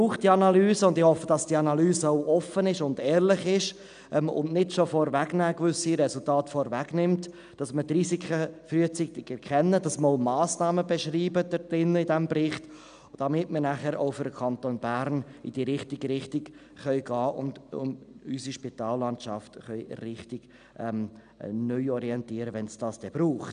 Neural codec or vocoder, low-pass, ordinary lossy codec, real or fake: none; 9.9 kHz; none; real